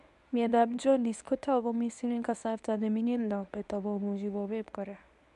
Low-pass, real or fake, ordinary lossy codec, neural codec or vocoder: 10.8 kHz; fake; none; codec, 24 kHz, 0.9 kbps, WavTokenizer, medium speech release version 1